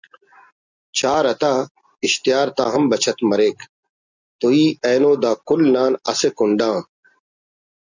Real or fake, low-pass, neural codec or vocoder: real; 7.2 kHz; none